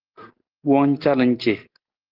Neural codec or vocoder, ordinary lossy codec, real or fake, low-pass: none; Opus, 16 kbps; real; 5.4 kHz